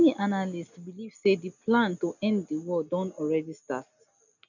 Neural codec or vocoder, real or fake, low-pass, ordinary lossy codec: none; real; 7.2 kHz; none